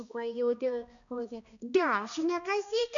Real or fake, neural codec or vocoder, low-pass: fake; codec, 16 kHz, 1 kbps, X-Codec, HuBERT features, trained on balanced general audio; 7.2 kHz